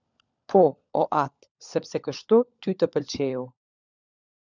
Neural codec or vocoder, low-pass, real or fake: codec, 16 kHz, 16 kbps, FunCodec, trained on LibriTTS, 50 frames a second; 7.2 kHz; fake